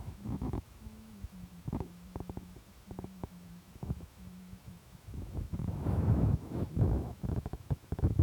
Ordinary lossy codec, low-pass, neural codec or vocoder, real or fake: none; 19.8 kHz; autoencoder, 48 kHz, 128 numbers a frame, DAC-VAE, trained on Japanese speech; fake